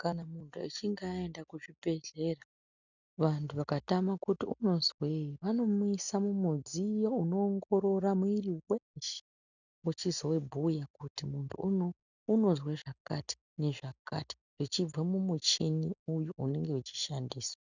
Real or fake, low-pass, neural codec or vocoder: real; 7.2 kHz; none